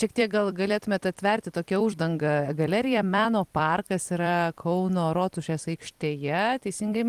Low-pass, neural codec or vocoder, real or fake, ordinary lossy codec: 14.4 kHz; vocoder, 44.1 kHz, 128 mel bands every 256 samples, BigVGAN v2; fake; Opus, 24 kbps